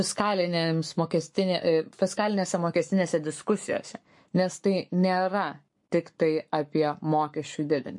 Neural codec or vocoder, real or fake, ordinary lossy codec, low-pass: codec, 44.1 kHz, 7.8 kbps, Pupu-Codec; fake; MP3, 48 kbps; 10.8 kHz